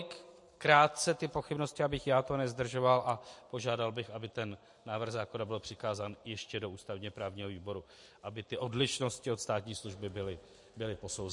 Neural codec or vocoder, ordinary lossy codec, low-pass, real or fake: none; MP3, 48 kbps; 10.8 kHz; real